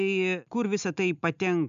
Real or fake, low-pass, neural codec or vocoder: real; 7.2 kHz; none